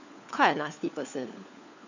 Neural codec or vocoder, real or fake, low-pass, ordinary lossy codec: codec, 16 kHz, 4 kbps, FunCodec, trained on LibriTTS, 50 frames a second; fake; 7.2 kHz; none